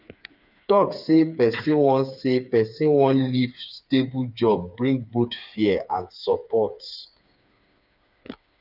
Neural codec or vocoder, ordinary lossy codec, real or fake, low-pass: codec, 16 kHz, 4 kbps, FreqCodec, smaller model; none; fake; 5.4 kHz